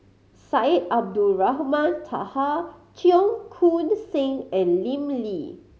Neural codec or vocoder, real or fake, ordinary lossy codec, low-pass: none; real; none; none